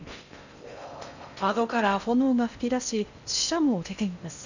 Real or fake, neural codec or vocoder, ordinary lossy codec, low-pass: fake; codec, 16 kHz in and 24 kHz out, 0.6 kbps, FocalCodec, streaming, 4096 codes; none; 7.2 kHz